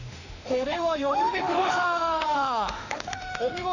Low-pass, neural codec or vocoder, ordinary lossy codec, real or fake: 7.2 kHz; autoencoder, 48 kHz, 32 numbers a frame, DAC-VAE, trained on Japanese speech; none; fake